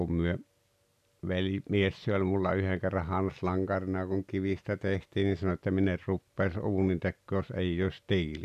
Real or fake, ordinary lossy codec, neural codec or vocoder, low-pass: real; none; none; 14.4 kHz